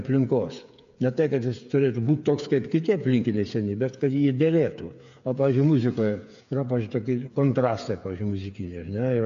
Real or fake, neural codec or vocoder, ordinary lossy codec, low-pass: fake; codec, 16 kHz, 8 kbps, FreqCodec, smaller model; AAC, 64 kbps; 7.2 kHz